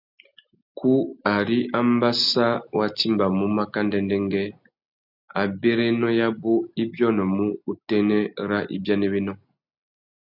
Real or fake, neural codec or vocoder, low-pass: real; none; 5.4 kHz